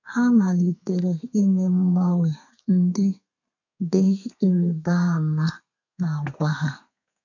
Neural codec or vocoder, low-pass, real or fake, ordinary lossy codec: codec, 32 kHz, 1.9 kbps, SNAC; 7.2 kHz; fake; none